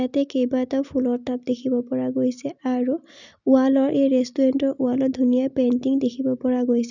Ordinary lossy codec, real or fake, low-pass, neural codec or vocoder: none; real; 7.2 kHz; none